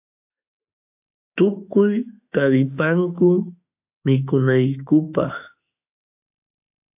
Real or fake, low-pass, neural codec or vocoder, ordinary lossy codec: fake; 3.6 kHz; autoencoder, 48 kHz, 32 numbers a frame, DAC-VAE, trained on Japanese speech; AAC, 32 kbps